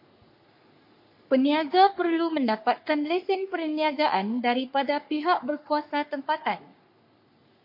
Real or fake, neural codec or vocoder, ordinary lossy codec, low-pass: fake; codec, 44.1 kHz, 3.4 kbps, Pupu-Codec; MP3, 32 kbps; 5.4 kHz